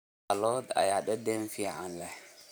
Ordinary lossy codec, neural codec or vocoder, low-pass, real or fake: none; vocoder, 44.1 kHz, 128 mel bands every 512 samples, BigVGAN v2; none; fake